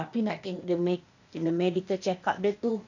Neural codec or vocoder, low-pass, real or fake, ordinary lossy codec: codec, 16 kHz, 0.8 kbps, ZipCodec; 7.2 kHz; fake; none